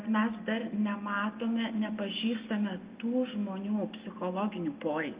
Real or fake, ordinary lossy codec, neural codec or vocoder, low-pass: real; Opus, 24 kbps; none; 3.6 kHz